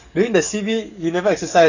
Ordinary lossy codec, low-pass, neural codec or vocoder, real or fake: none; 7.2 kHz; codec, 44.1 kHz, 7.8 kbps, DAC; fake